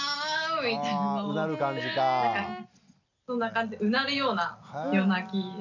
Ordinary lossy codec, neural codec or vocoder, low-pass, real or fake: none; none; 7.2 kHz; real